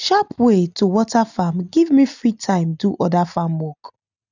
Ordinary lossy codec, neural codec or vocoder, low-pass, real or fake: none; none; 7.2 kHz; real